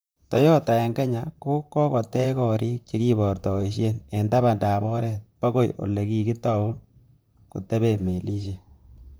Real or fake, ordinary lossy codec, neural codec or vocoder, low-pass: fake; none; vocoder, 44.1 kHz, 128 mel bands, Pupu-Vocoder; none